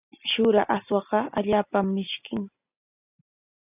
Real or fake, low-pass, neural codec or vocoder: real; 3.6 kHz; none